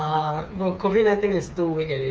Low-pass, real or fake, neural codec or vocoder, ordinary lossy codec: none; fake; codec, 16 kHz, 4 kbps, FreqCodec, smaller model; none